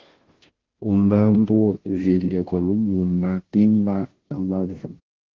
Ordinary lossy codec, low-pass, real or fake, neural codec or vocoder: Opus, 16 kbps; 7.2 kHz; fake; codec, 16 kHz, 0.5 kbps, FunCodec, trained on Chinese and English, 25 frames a second